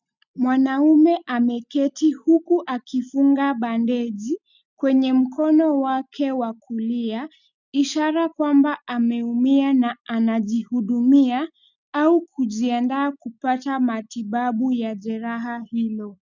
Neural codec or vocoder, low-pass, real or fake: none; 7.2 kHz; real